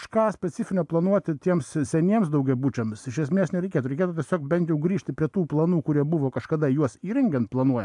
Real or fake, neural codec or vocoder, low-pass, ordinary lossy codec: real; none; 10.8 kHz; AAC, 64 kbps